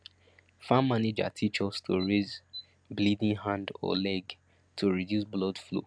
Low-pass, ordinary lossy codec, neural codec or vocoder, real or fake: 9.9 kHz; none; none; real